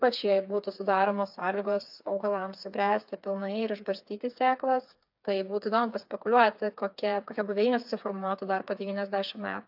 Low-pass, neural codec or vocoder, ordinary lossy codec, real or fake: 5.4 kHz; codec, 16 kHz, 4 kbps, FreqCodec, smaller model; AAC, 48 kbps; fake